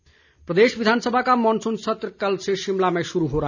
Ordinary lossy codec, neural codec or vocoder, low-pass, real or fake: none; none; 7.2 kHz; real